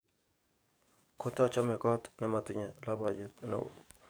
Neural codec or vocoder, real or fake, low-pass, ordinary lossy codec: codec, 44.1 kHz, 7.8 kbps, DAC; fake; none; none